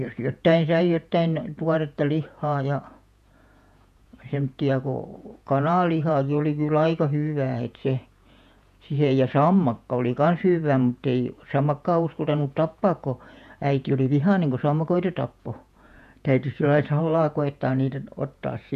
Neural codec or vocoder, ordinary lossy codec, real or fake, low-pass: vocoder, 48 kHz, 128 mel bands, Vocos; none; fake; 14.4 kHz